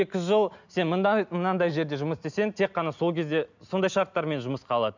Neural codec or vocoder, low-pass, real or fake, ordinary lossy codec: none; 7.2 kHz; real; none